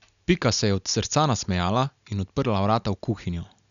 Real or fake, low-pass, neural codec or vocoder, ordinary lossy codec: real; 7.2 kHz; none; none